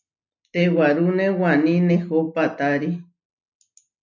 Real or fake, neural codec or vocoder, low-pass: real; none; 7.2 kHz